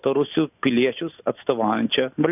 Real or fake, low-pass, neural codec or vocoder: real; 3.6 kHz; none